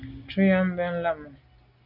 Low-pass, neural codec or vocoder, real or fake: 5.4 kHz; none; real